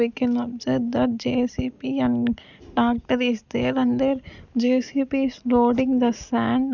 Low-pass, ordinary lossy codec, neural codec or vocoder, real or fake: 7.2 kHz; none; none; real